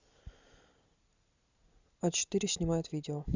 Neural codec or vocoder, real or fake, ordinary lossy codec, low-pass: none; real; Opus, 64 kbps; 7.2 kHz